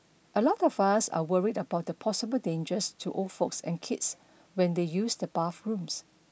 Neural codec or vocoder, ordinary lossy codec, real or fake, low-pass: none; none; real; none